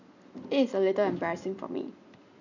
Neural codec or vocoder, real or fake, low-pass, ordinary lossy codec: none; real; 7.2 kHz; none